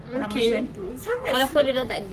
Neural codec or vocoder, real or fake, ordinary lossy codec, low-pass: codec, 44.1 kHz, 3.4 kbps, Pupu-Codec; fake; Opus, 32 kbps; 14.4 kHz